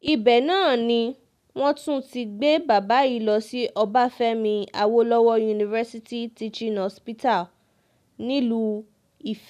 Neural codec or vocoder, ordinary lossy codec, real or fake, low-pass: none; none; real; 14.4 kHz